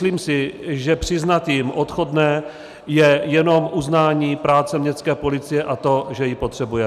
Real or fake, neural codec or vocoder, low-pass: real; none; 14.4 kHz